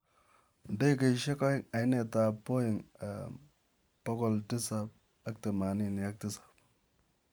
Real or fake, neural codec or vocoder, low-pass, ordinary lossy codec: fake; vocoder, 44.1 kHz, 128 mel bands every 512 samples, BigVGAN v2; none; none